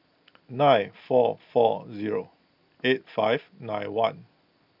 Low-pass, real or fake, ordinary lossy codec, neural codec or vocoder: 5.4 kHz; real; none; none